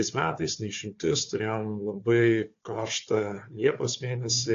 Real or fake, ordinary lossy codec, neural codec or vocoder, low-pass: fake; AAC, 48 kbps; codec, 16 kHz, 4 kbps, FunCodec, trained on Chinese and English, 50 frames a second; 7.2 kHz